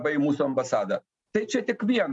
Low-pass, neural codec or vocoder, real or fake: 10.8 kHz; none; real